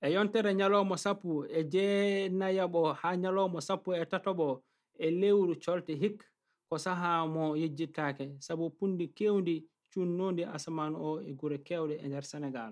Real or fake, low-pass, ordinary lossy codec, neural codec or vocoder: real; none; none; none